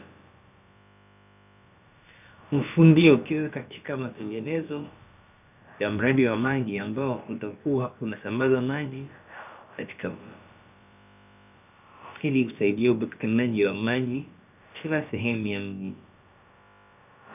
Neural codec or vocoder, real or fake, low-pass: codec, 16 kHz, about 1 kbps, DyCAST, with the encoder's durations; fake; 3.6 kHz